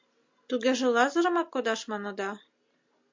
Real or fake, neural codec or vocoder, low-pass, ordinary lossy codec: real; none; 7.2 kHz; MP3, 48 kbps